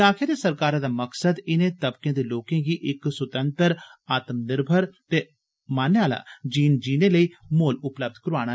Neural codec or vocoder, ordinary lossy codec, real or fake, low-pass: none; none; real; none